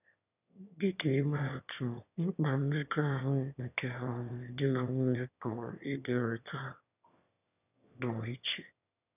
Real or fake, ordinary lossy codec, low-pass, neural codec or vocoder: fake; none; 3.6 kHz; autoencoder, 22.05 kHz, a latent of 192 numbers a frame, VITS, trained on one speaker